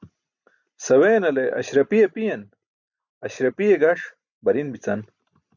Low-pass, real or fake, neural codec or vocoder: 7.2 kHz; real; none